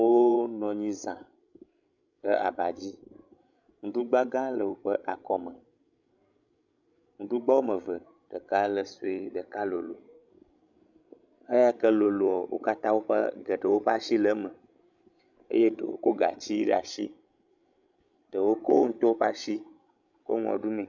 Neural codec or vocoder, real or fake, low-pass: codec, 16 kHz, 16 kbps, FreqCodec, larger model; fake; 7.2 kHz